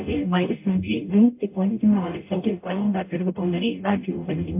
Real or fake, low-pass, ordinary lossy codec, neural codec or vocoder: fake; 3.6 kHz; MP3, 32 kbps; codec, 44.1 kHz, 0.9 kbps, DAC